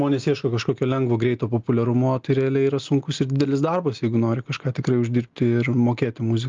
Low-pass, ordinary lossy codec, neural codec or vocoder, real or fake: 7.2 kHz; Opus, 32 kbps; none; real